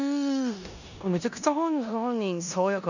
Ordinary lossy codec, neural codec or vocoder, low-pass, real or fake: none; codec, 16 kHz in and 24 kHz out, 0.9 kbps, LongCat-Audio-Codec, four codebook decoder; 7.2 kHz; fake